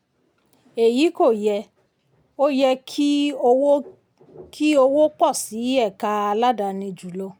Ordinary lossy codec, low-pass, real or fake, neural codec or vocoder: none; none; real; none